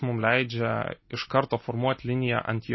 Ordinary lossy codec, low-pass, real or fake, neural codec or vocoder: MP3, 24 kbps; 7.2 kHz; real; none